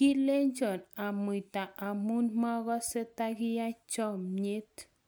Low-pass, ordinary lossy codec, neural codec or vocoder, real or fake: none; none; none; real